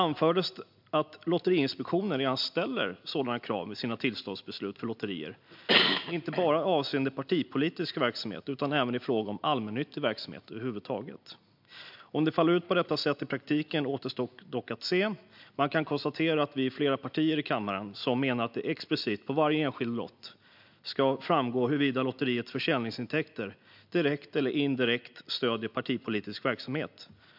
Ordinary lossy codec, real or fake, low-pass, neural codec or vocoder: MP3, 48 kbps; real; 5.4 kHz; none